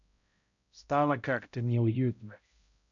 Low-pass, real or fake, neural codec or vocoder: 7.2 kHz; fake; codec, 16 kHz, 0.5 kbps, X-Codec, HuBERT features, trained on balanced general audio